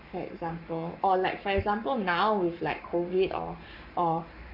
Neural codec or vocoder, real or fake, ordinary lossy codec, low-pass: codec, 44.1 kHz, 7.8 kbps, Pupu-Codec; fake; MP3, 48 kbps; 5.4 kHz